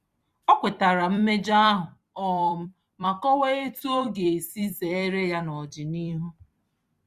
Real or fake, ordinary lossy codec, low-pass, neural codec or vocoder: fake; Opus, 64 kbps; 14.4 kHz; vocoder, 44.1 kHz, 128 mel bands every 256 samples, BigVGAN v2